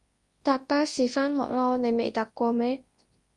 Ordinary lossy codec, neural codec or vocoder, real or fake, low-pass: Opus, 32 kbps; codec, 24 kHz, 0.9 kbps, WavTokenizer, large speech release; fake; 10.8 kHz